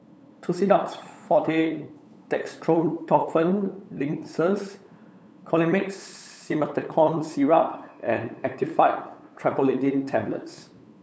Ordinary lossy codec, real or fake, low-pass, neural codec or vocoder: none; fake; none; codec, 16 kHz, 8 kbps, FunCodec, trained on LibriTTS, 25 frames a second